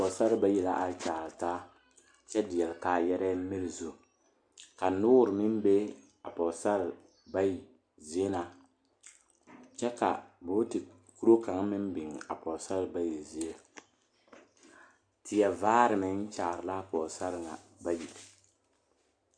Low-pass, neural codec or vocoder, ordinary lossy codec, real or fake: 9.9 kHz; none; MP3, 96 kbps; real